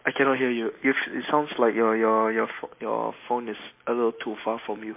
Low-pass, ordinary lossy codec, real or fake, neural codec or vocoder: 3.6 kHz; MP3, 24 kbps; real; none